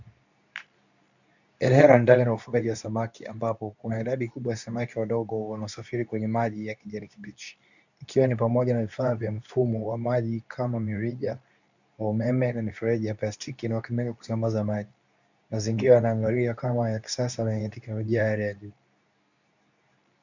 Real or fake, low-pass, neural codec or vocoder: fake; 7.2 kHz; codec, 24 kHz, 0.9 kbps, WavTokenizer, medium speech release version 2